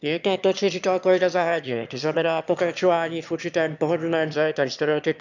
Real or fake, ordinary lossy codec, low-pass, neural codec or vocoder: fake; none; 7.2 kHz; autoencoder, 22.05 kHz, a latent of 192 numbers a frame, VITS, trained on one speaker